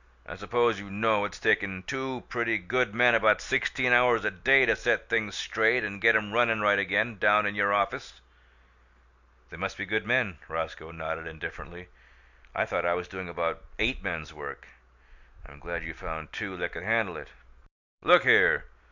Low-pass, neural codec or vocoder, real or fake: 7.2 kHz; none; real